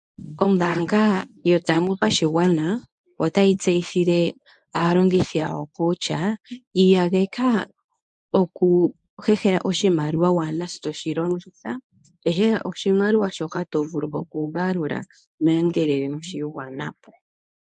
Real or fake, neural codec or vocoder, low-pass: fake; codec, 24 kHz, 0.9 kbps, WavTokenizer, medium speech release version 1; 10.8 kHz